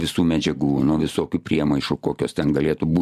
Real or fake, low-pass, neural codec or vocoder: real; 14.4 kHz; none